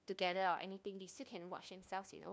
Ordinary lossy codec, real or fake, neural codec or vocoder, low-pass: none; fake; codec, 16 kHz, 1 kbps, FunCodec, trained on LibriTTS, 50 frames a second; none